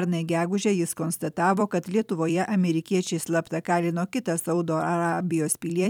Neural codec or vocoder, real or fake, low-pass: vocoder, 44.1 kHz, 128 mel bands every 256 samples, BigVGAN v2; fake; 19.8 kHz